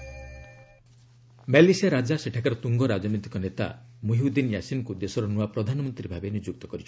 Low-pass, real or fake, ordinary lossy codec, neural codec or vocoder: none; real; none; none